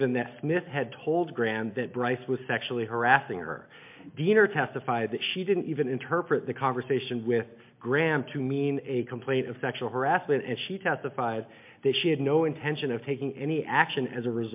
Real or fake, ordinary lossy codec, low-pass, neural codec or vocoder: real; MP3, 32 kbps; 3.6 kHz; none